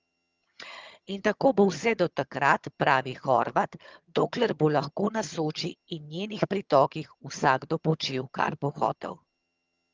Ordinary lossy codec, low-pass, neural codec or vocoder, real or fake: Opus, 32 kbps; 7.2 kHz; vocoder, 22.05 kHz, 80 mel bands, HiFi-GAN; fake